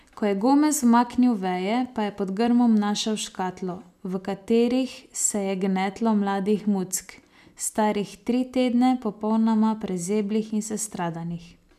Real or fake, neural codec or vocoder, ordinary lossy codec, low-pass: real; none; none; 14.4 kHz